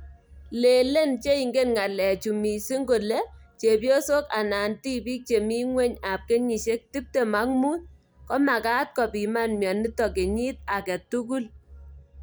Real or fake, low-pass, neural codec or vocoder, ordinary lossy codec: real; none; none; none